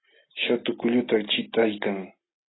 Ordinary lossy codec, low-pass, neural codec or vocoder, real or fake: AAC, 16 kbps; 7.2 kHz; none; real